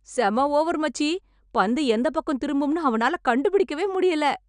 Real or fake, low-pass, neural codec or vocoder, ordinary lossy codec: real; 9.9 kHz; none; none